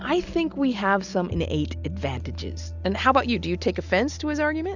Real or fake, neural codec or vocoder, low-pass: real; none; 7.2 kHz